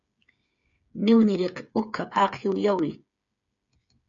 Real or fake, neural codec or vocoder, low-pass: fake; codec, 16 kHz, 8 kbps, FreqCodec, smaller model; 7.2 kHz